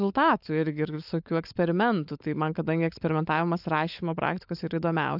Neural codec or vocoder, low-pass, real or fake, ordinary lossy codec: codec, 16 kHz, 16 kbps, FunCodec, trained on LibriTTS, 50 frames a second; 5.4 kHz; fake; AAC, 48 kbps